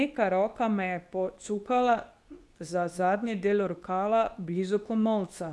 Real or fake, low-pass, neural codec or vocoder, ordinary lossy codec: fake; none; codec, 24 kHz, 0.9 kbps, WavTokenizer, medium speech release version 2; none